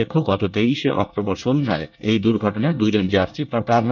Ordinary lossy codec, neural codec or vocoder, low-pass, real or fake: none; codec, 24 kHz, 1 kbps, SNAC; 7.2 kHz; fake